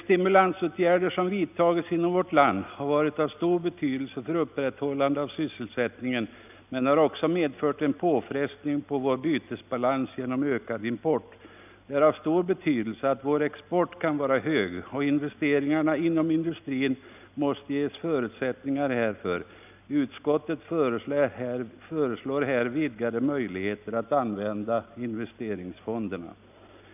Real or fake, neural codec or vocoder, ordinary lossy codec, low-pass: real; none; none; 3.6 kHz